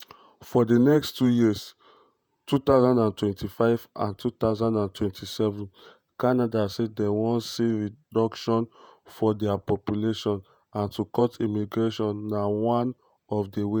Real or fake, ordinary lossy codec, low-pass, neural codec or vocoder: fake; none; none; vocoder, 48 kHz, 128 mel bands, Vocos